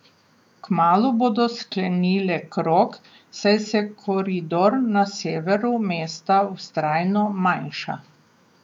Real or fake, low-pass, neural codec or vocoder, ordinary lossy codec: fake; 19.8 kHz; autoencoder, 48 kHz, 128 numbers a frame, DAC-VAE, trained on Japanese speech; none